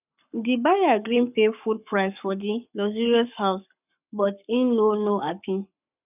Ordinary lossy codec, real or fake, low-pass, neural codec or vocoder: none; fake; 3.6 kHz; codec, 44.1 kHz, 7.8 kbps, Pupu-Codec